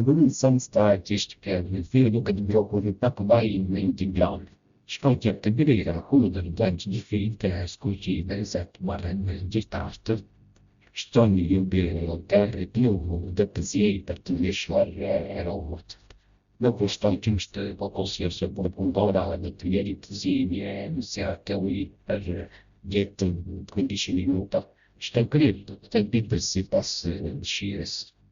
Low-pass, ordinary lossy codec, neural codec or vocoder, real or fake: 7.2 kHz; Opus, 64 kbps; codec, 16 kHz, 0.5 kbps, FreqCodec, smaller model; fake